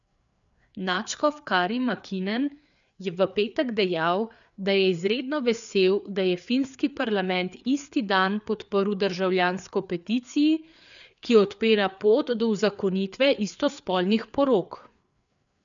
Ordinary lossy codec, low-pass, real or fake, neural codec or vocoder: none; 7.2 kHz; fake; codec, 16 kHz, 4 kbps, FreqCodec, larger model